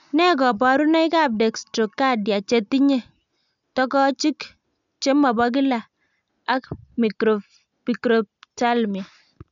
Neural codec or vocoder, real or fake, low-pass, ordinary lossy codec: none; real; 7.2 kHz; none